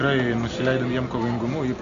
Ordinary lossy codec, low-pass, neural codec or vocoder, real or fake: Opus, 64 kbps; 7.2 kHz; none; real